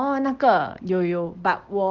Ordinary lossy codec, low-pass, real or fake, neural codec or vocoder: Opus, 16 kbps; 7.2 kHz; real; none